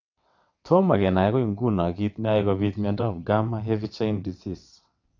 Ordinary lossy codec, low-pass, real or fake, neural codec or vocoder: none; 7.2 kHz; fake; vocoder, 22.05 kHz, 80 mel bands, WaveNeXt